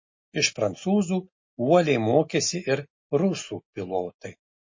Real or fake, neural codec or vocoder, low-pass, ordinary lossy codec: real; none; 7.2 kHz; MP3, 32 kbps